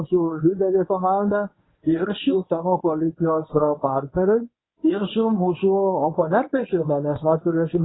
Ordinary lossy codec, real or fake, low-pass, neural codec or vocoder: AAC, 16 kbps; fake; 7.2 kHz; codec, 24 kHz, 0.9 kbps, WavTokenizer, medium speech release version 1